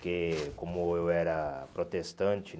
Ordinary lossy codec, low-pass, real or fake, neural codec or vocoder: none; none; real; none